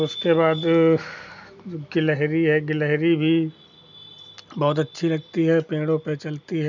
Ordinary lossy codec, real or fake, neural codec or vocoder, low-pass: none; real; none; 7.2 kHz